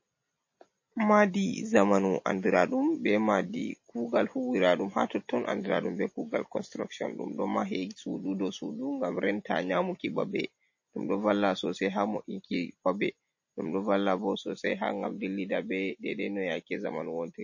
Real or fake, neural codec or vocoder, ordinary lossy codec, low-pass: real; none; MP3, 32 kbps; 7.2 kHz